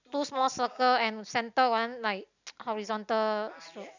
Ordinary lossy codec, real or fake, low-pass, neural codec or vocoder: none; real; 7.2 kHz; none